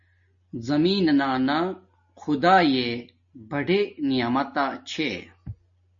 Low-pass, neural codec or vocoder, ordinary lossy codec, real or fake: 7.2 kHz; none; MP3, 32 kbps; real